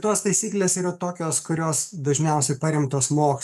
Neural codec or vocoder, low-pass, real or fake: codec, 44.1 kHz, 7.8 kbps, DAC; 14.4 kHz; fake